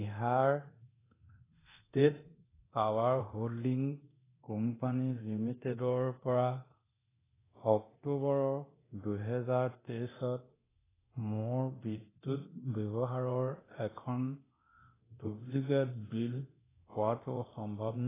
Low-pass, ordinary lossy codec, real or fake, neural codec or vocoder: 3.6 kHz; AAC, 16 kbps; fake; codec, 24 kHz, 0.9 kbps, DualCodec